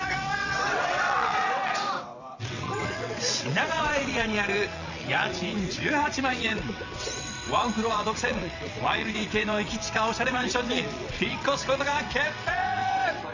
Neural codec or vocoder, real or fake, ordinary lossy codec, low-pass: vocoder, 22.05 kHz, 80 mel bands, WaveNeXt; fake; none; 7.2 kHz